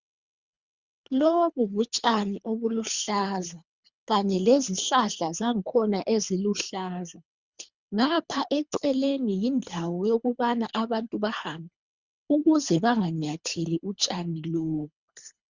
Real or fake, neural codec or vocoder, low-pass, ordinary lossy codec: fake; codec, 24 kHz, 3 kbps, HILCodec; 7.2 kHz; Opus, 64 kbps